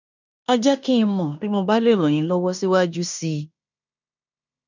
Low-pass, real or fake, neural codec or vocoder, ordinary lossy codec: 7.2 kHz; fake; codec, 16 kHz in and 24 kHz out, 0.9 kbps, LongCat-Audio-Codec, fine tuned four codebook decoder; none